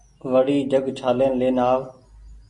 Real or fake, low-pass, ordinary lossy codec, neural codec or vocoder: real; 10.8 kHz; MP3, 48 kbps; none